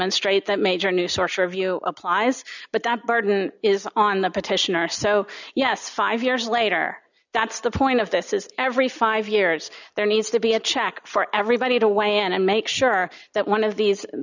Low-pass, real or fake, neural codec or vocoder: 7.2 kHz; real; none